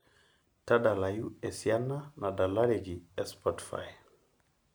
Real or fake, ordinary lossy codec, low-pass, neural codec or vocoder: real; none; none; none